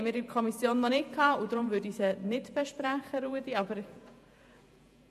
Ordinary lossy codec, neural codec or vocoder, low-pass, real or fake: MP3, 64 kbps; vocoder, 48 kHz, 128 mel bands, Vocos; 14.4 kHz; fake